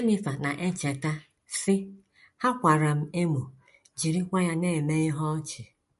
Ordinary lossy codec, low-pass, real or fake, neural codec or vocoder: MP3, 48 kbps; 14.4 kHz; real; none